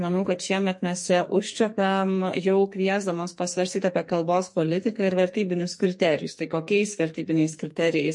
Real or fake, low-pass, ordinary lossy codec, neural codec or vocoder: fake; 10.8 kHz; MP3, 48 kbps; codec, 44.1 kHz, 2.6 kbps, SNAC